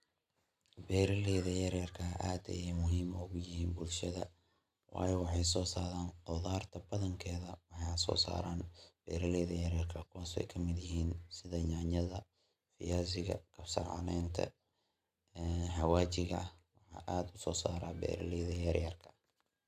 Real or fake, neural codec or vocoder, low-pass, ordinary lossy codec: real; none; 14.4 kHz; AAC, 96 kbps